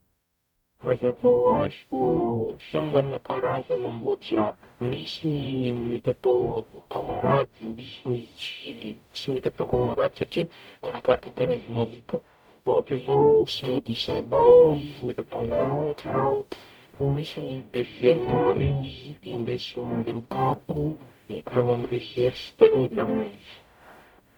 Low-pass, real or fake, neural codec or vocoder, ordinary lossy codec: 19.8 kHz; fake; codec, 44.1 kHz, 0.9 kbps, DAC; none